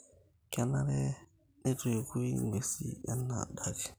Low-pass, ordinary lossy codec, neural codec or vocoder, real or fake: none; none; none; real